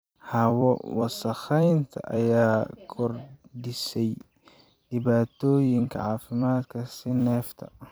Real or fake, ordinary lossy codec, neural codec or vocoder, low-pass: fake; none; vocoder, 44.1 kHz, 128 mel bands every 256 samples, BigVGAN v2; none